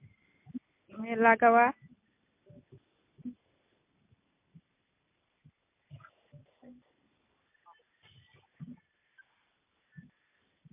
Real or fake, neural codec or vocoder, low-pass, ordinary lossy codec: real; none; 3.6 kHz; MP3, 32 kbps